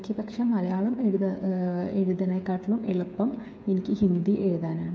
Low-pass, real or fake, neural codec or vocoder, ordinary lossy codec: none; fake; codec, 16 kHz, 8 kbps, FreqCodec, smaller model; none